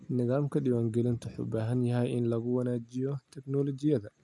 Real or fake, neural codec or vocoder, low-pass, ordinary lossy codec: real; none; none; none